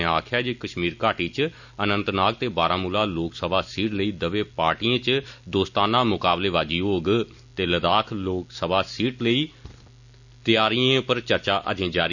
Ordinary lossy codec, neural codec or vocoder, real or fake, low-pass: none; none; real; 7.2 kHz